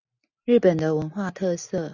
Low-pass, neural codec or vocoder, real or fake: 7.2 kHz; none; real